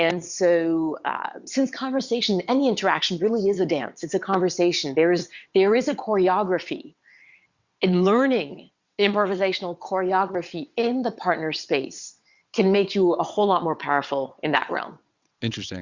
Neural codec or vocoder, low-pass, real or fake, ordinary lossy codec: vocoder, 22.05 kHz, 80 mel bands, WaveNeXt; 7.2 kHz; fake; Opus, 64 kbps